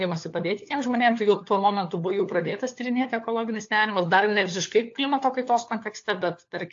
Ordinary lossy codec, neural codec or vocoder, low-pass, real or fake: AAC, 48 kbps; codec, 16 kHz, 4 kbps, FunCodec, trained on LibriTTS, 50 frames a second; 7.2 kHz; fake